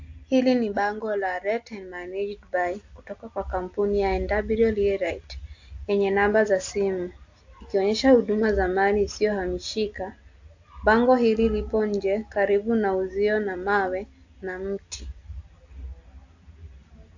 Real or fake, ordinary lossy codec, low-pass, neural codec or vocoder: real; AAC, 48 kbps; 7.2 kHz; none